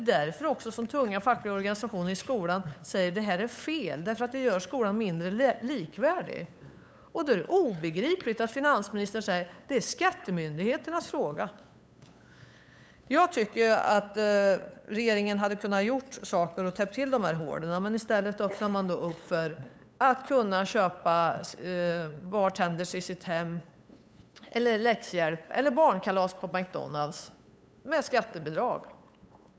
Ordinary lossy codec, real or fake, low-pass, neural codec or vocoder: none; fake; none; codec, 16 kHz, 8 kbps, FunCodec, trained on LibriTTS, 25 frames a second